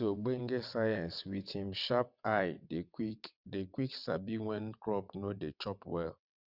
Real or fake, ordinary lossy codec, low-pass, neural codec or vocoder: fake; none; 5.4 kHz; vocoder, 22.05 kHz, 80 mel bands, WaveNeXt